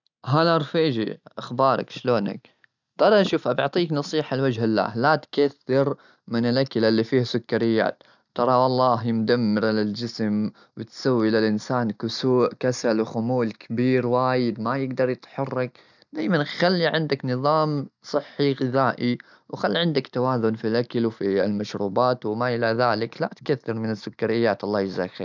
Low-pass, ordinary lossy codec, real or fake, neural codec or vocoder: 7.2 kHz; none; real; none